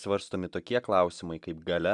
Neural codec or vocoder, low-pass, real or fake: none; 10.8 kHz; real